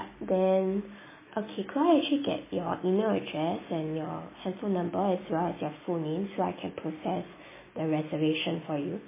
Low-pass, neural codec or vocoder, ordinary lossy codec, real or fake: 3.6 kHz; autoencoder, 48 kHz, 128 numbers a frame, DAC-VAE, trained on Japanese speech; MP3, 16 kbps; fake